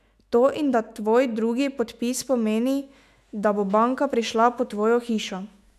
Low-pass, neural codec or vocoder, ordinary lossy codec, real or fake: 14.4 kHz; autoencoder, 48 kHz, 128 numbers a frame, DAC-VAE, trained on Japanese speech; none; fake